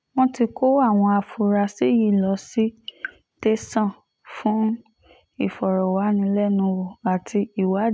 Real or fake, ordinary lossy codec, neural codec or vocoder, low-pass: real; none; none; none